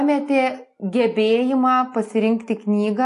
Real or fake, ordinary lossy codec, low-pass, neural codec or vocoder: real; AAC, 48 kbps; 10.8 kHz; none